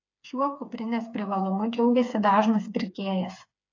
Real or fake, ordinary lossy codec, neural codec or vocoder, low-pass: fake; AAC, 48 kbps; codec, 16 kHz, 4 kbps, FreqCodec, smaller model; 7.2 kHz